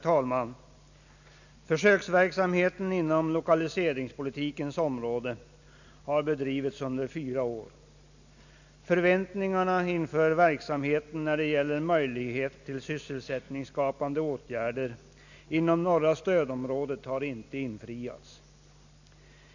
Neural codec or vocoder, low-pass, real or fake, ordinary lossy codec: none; 7.2 kHz; real; none